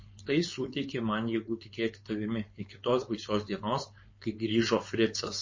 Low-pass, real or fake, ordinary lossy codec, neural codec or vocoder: 7.2 kHz; fake; MP3, 32 kbps; codec, 16 kHz, 4.8 kbps, FACodec